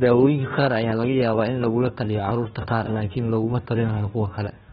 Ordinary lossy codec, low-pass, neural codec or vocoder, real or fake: AAC, 16 kbps; 7.2 kHz; codec, 16 kHz, 1 kbps, FunCodec, trained on Chinese and English, 50 frames a second; fake